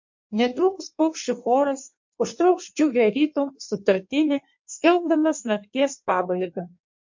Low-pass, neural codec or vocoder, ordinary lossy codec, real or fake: 7.2 kHz; codec, 16 kHz in and 24 kHz out, 1.1 kbps, FireRedTTS-2 codec; MP3, 48 kbps; fake